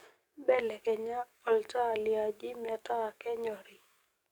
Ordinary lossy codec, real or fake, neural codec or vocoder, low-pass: none; fake; codec, 44.1 kHz, 7.8 kbps, DAC; none